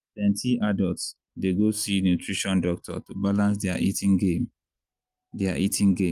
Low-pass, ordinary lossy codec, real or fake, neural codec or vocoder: 14.4 kHz; Opus, 32 kbps; real; none